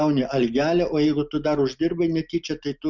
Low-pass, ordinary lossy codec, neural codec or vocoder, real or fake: 7.2 kHz; Opus, 64 kbps; none; real